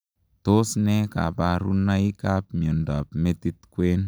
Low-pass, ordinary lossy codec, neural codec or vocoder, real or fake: none; none; none; real